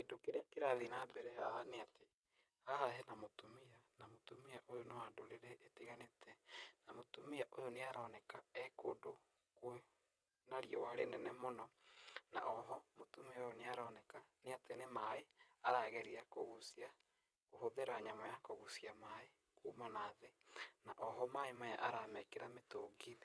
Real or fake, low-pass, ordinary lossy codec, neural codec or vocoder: fake; none; none; vocoder, 22.05 kHz, 80 mel bands, WaveNeXt